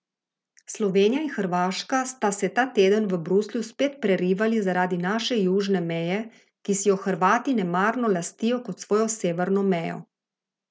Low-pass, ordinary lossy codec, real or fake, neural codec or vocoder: none; none; real; none